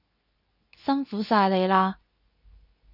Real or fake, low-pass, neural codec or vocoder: fake; 5.4 kHz; codec, 24 kHz, 0.9 kbps, WavTokenizer, medium speech release version 2